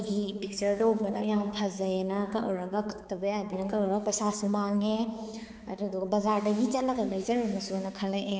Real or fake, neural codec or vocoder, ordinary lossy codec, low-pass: fake; codec, 16 kHz, 4 kbps, X-Codec, HuBERT features, trained on balanced general audio; none; none